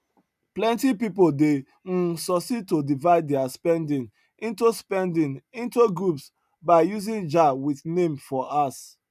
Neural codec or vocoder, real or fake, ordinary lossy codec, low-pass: none; real; none; 14.4 kHz